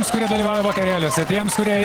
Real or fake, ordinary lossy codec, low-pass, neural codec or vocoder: fake; Opus, 16 kbps; 19.8 kHz; vocoder, 48 kHz, 128 mel bands, Vocos